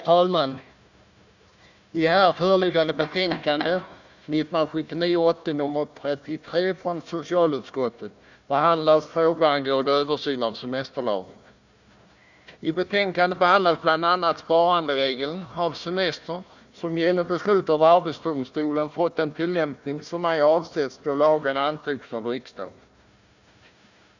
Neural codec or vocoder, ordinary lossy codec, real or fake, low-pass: codec, 16 kHz, 1 kbps, FunCodec, trained on Chinese and English, 50 frames a second; none; fake; 7.2 kHz